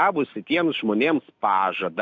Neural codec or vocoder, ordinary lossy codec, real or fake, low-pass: none; MP3, 64 kbps; real; 7.2 kHz